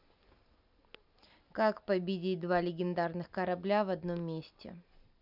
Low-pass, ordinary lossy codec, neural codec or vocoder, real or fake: 5.4 kHz; none; none; real